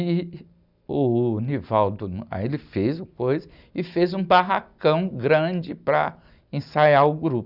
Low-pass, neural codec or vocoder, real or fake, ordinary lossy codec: 5.4 kHz; none; real; none